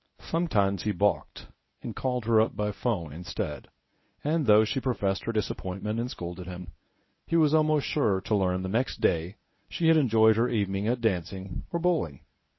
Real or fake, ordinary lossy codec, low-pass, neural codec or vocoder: fake; MP3, 24 kbps; 7.2 kHz; codec, 24 kHz, 0.9 kbps, WavTokenizer, medium speech release version 1